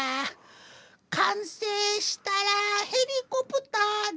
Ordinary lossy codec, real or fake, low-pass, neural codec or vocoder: none; real; none; none